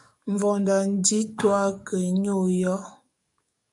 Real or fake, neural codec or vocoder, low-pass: fake; codec, 44.1 kHz, 7.8 kbps, DAC; 10.8 kHz